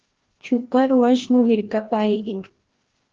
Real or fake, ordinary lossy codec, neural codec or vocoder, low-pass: fake; Opus, 16 kbps; codec, 16 kHz, 1 kbps, FreqCodec, larger model; 7.2 kHz